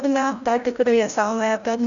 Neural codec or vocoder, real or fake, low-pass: codec, 16 kHz, 0.5 kbps, FreqCodec, larger model; fake; 7.2 kHz